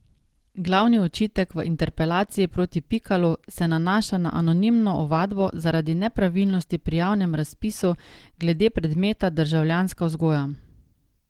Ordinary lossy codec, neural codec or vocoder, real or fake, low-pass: Opus, 16 kbps; none; real; 19.8 kHz